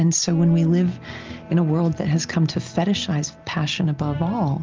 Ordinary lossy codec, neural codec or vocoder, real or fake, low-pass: Opus, 32 kbps; none; real; 7.2 kHz